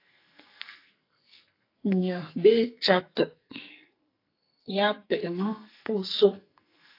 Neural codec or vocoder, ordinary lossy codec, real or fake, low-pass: codec, 44.1 kHz, 2.6 kbps, SNAC; AAC, 32 kbps; fake; 5.4 kHz